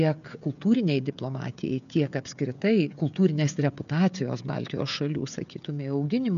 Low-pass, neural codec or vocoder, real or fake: 7.2 kHz; codec, 16 kHz, 16 kbps, FreqCodec, smaller model; fake